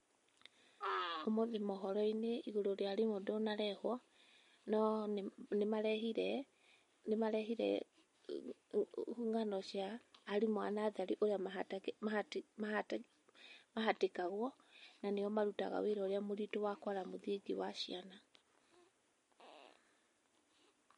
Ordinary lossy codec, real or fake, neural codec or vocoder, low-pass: MP3, 48 kbps; real; none; 10.8 kHz